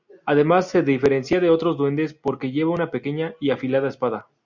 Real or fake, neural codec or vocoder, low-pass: real; none; 7.2 kHz